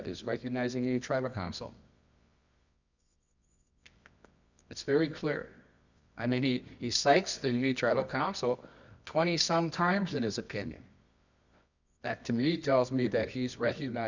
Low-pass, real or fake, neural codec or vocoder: 7.2 kHz; fake; codec, 24 kHz, 0.9 kbps, WavTokenizer, medium music audio release